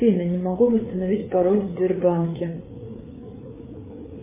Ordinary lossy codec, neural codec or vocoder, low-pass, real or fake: MP3, 16 kbps; codec, 16 kHz, 4 kbps, FreqCodec, larger model; 3.6 kHz; fake